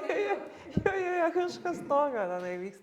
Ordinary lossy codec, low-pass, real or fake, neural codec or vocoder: Opus, 64 kbps; 19.8 kHz; real; none